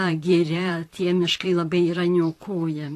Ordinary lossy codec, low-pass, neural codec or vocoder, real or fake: AAC, 48 kbps; 14.4 kHz; vocoder, 44.1 kHz, 128 mel bands, Pupu-Vocoder; fake